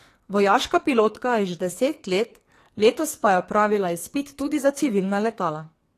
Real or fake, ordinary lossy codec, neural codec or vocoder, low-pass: fake; AAC, 48 kbps; codec, 44.1 kHz, 2.6 kbps, SNAC; 14.4 kHz